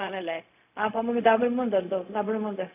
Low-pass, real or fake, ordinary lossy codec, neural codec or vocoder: 3.6 kHz; fake; AAC, 32 kbps; codec, 16 kHz, 0.4 kbps, LongCat-Audio-Codec